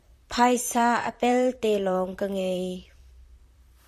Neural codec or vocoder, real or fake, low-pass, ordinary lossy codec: vocoder, 44.1 kHz, 128 mel bands, Pupu-Vocoder; fake; 14.4 kHz; MP3, 96 kbps